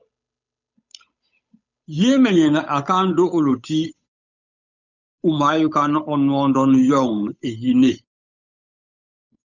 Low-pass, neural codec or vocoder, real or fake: 7.2 kHz; codec, 16 kHz, 8 kbps, FunCodec, trained on Chinese and English, 25 frames a second; fake